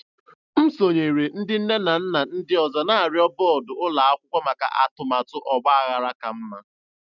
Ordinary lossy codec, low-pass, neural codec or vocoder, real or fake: none; 7.2 kHz; none; real